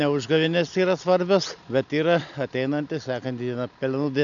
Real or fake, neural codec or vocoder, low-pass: real; none; 7.2 kHz